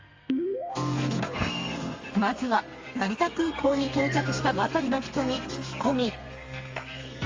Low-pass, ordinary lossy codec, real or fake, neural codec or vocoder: 7.2 kHz; Opus, 32 kbps; fake; codec, 32 kHz, 1.9 kbps, SNAC